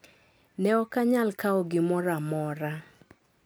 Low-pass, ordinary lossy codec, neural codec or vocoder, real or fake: none; none; none; real